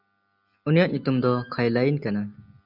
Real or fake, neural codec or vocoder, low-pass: real; none; 5.4 kHz